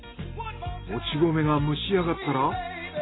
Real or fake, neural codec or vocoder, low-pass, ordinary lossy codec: real; none; 7.2 kHz; AAC, 16 kbps